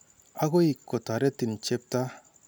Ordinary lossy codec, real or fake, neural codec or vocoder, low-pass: none; real; none; none